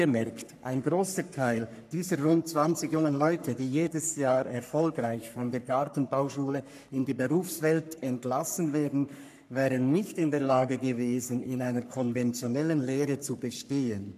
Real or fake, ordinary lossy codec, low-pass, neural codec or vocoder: fake; none; 14.4 kHz; codec, 44.1 kHz, 3.4 kbps, Pupu-Codec